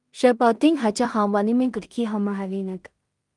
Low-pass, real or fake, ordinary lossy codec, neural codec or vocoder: 10.8 kHz; fake; Opus, 32 kbps; codec, 16 kHz in and 24 kHz out, 0.4 kbps, LongCat-Audio-Codec, two codebook decoder